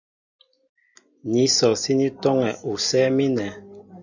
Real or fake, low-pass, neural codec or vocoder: real; 7.2 kHz; none